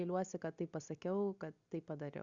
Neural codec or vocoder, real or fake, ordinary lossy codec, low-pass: none; real; Opus, 64 kbps; 7.2 kHz